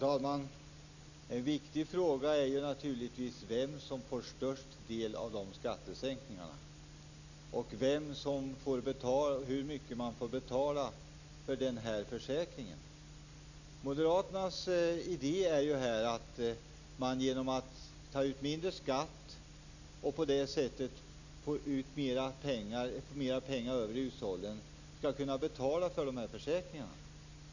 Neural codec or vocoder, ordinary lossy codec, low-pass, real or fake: none; none; 7.2 kHz; real